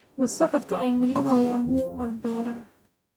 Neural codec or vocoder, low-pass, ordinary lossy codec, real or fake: codec, 44.1 kHz, 0.9 kbps, DAC; none; none; fake